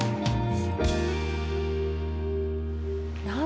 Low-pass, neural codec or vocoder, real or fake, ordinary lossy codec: none; none; real; none